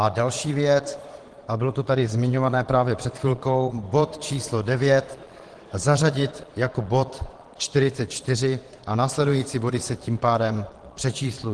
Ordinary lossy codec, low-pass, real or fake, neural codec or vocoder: Opus, 16 kbps; 9.9 kHz; fake; vocoder, 22.05 kHz, 80 mel bands, Vocos